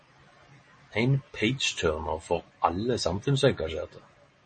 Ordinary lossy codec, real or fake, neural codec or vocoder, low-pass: MP3, 32 kbps; real; none; 9.9 kHz